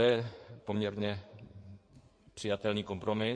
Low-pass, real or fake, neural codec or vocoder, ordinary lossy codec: 9.9 kHz; fake; codec, 16 kHz in and 24 kHz out, 2.2 kbps, FireRedTTS-2 codec; MP3, 48 kbps